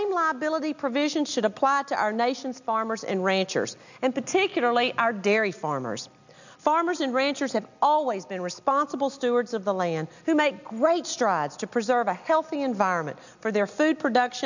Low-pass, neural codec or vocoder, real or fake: 7.2 kHz; none; real